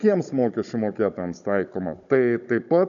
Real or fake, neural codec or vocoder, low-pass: fake; codec, 16 kHz, 16 kbps, FunCodec, trained on Chinese and English, 50 frames a second; 7.2 kHz